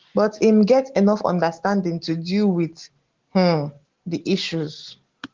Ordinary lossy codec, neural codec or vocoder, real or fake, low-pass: Opus, 16 kbps; none; real; 7.2 kHz